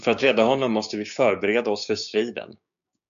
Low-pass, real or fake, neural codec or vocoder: 7.2 kHz; fake; codec, 16 kHz, 6 kbps, DAC